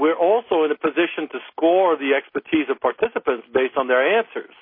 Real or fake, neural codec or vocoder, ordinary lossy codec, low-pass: real; none; MP3, 24 kbps; 5.4 kHz